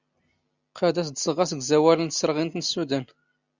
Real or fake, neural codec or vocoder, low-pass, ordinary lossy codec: real; none; 7.2 kHz; Opus, 64 kbps